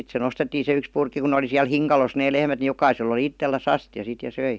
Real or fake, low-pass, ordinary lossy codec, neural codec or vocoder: real; none; none; none